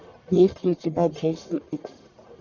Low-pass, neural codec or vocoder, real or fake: 7.2 kHz; codec, 44.1 kHz, 1.7 kbps, Pupu-Codec; fake